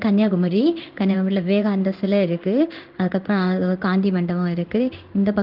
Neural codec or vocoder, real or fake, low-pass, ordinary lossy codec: codec, 16 kHz in and 24 kHz out, 1 kbps, XY-Tokenizer; fake; 5.4 kHz; Opus, 32 kbps